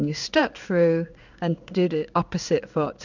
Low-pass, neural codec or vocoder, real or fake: 7.2 kHz; codec, 16 kHz in and 24 kHz out, 1 kbps, XY-Tokenizer; fake